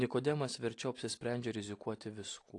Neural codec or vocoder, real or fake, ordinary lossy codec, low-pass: none; real; AAC, 48 kbps; 10.8 kHz